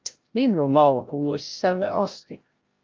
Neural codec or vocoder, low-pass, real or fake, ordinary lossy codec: codec, 16 kHz, 0.5 kbps, FreqCodec, larger model; 7.2 kHz; fake; Opus, 32 kbps